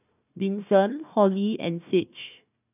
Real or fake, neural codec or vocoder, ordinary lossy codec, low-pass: fake; codec, 16 kHz, 1 kbps, FunCodec, trained on Chinese and English, 50 frames a second; none; 3.6 kHz